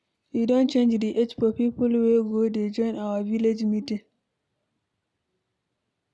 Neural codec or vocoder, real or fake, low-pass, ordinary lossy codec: none; real; none; none